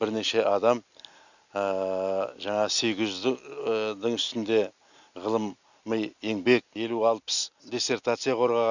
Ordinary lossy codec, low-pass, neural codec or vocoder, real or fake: none; 7.2 kHz; none; real